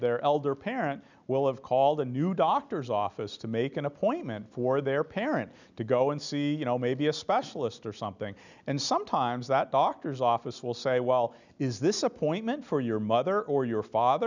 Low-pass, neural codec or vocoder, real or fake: 7.2 kHz; none; real